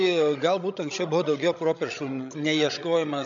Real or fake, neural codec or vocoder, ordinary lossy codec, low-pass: fake; codec, 16 kHz, 16 kbps, FreqCodec, larger model; AAC, 64 kbps; 7.2 kHz